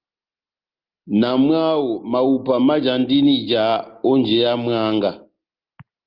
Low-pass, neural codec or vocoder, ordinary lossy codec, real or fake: 5.4 kHz; none; Opus, 32 kbps; real